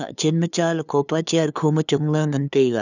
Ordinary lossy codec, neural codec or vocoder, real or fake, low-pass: none; codec, 16 kHz, 2 kbps, FunCodec, trained on LibriTTS, 25 frames a second; fake; 7.2 kHz